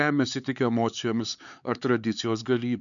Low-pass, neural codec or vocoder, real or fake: 7.2 kHz; codec, 16 kHz, 4 kbps, X-Codec, HuBERT features, trained on LibriSpeech; fake